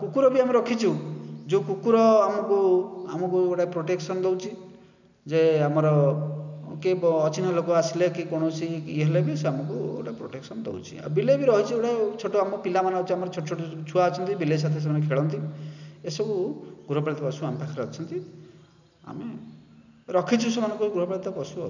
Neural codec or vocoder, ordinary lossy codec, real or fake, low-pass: none; none; real; 7.2 kHz